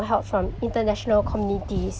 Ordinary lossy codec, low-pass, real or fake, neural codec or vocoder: none; none; real; none